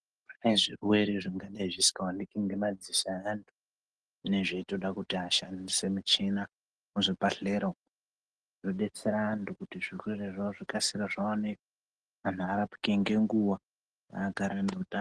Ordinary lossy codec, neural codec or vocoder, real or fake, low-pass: Opus, 16 kbps; none; real; 9.9 kHz